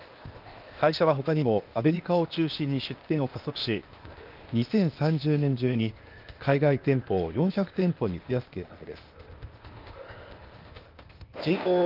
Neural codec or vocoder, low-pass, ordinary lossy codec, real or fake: codec, 16 kHz, 0.8 kbps, ZipCodec; 5.4 kHz; Opus, 32 kbps; fake